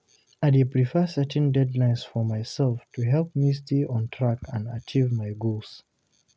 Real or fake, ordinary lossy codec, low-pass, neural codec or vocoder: real; none; none; none